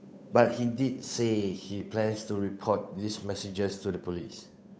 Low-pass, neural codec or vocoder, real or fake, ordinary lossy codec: none; codec, 16 kHz, 8 kbps, FunCodec, trained on Chinese and English, 25 frames a second; fake; none